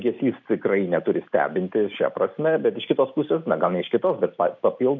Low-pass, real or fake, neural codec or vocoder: 7.2 kHz; real; none